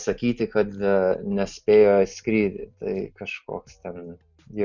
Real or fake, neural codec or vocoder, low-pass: real; none; 7.2 kHz